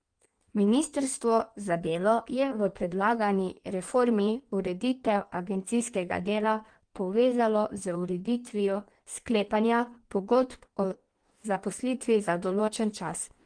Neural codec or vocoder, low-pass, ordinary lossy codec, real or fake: codec, 16 kHz in and 24 kHz out, 1.1 kbps, FireRedTTS-2 codec; 9.9 kHz; Opus, 24 kbps; fake